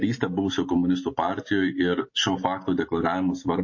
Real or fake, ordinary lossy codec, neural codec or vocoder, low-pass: real; MP3, 32 kbps; none; 7.2 kHz